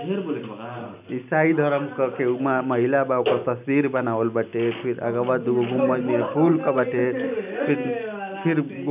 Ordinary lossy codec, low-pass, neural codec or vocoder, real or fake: none; 3.6 kHz; none; real